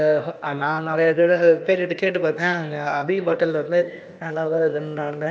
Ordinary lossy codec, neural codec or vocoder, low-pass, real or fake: none; codec, 16 kHz, 0.8 kbps, ZipCodec; none; fake